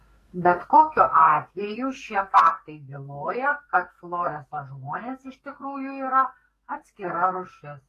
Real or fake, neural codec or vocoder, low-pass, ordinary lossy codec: fake; codec, 44.1 kHz, 2.6 kbps, SNAC; 14.4 kHz; AAC, 48 kbps